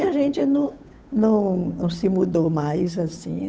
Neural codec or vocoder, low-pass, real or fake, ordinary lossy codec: codec, 16 kHz, 8 kbps, FunCodec, trained on Chinese and English, 25 frames a second; none; fake; none